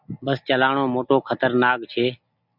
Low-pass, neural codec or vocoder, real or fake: 5.4 kHz; none; real